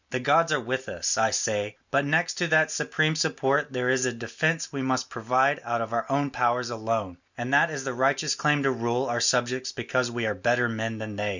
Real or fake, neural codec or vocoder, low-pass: real; none; 7.2 kHz